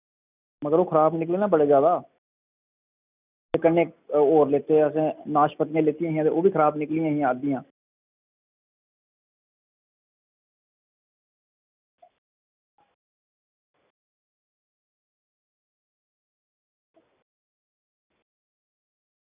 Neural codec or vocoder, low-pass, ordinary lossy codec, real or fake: none; 3.6 kHz; none; real